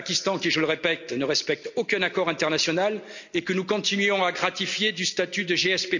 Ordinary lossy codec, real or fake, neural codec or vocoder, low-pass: none; real; none; 7.2 kHz